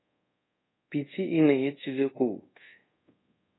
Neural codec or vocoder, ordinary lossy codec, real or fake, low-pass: codec, 24 kHz, 0.5 kbps, DualCodec; AAC, 16 kbps; fake; 7.2 kHz